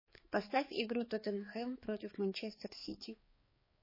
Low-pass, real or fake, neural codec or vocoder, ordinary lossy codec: 5.4 kHz; fake; codec, 16 kHz, 4 kbps, X-Codec, HuBERT features, trained on balanced general audio; MP3, 24 kbps